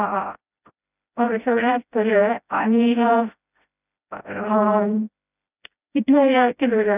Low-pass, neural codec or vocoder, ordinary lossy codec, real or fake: 3.6 kHz; codec, 16 kHz, 0.5 kbps, FreqCodec, smaller model; none; fake